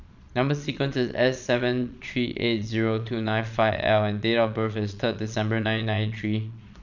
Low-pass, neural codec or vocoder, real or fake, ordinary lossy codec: 7.2 kHz; vocoder, 22.05 kHz, 80 mel bands, Vocos; fake; none